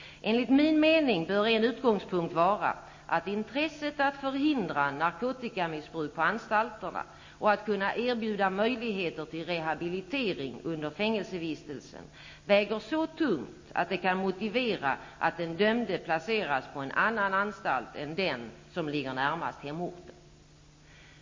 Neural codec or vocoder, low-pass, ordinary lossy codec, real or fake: none; 7.2 kHz; MP3, 32 kbps; real